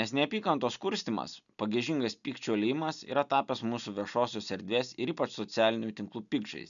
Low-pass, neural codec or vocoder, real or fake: 7.2 kHz; none; real